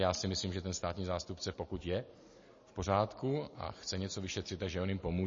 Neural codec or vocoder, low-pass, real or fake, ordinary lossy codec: none; 7.2 kHz; real; MP3, 32 kbps